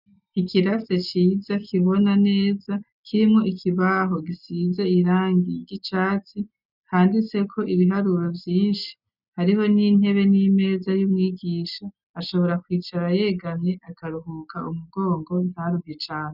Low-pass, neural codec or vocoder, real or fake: 5.4 kHz; none; real